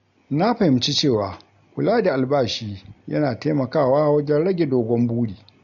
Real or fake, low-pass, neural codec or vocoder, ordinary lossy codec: real; 7.2 kHz; none; MP3, 48 kbps